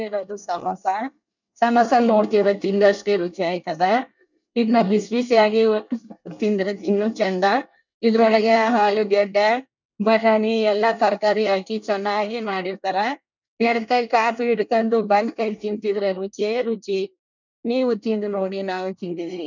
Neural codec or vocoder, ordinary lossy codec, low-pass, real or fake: codec, 24 kHz, 1 kbps, SNAC; none; 7.2 kHz; fake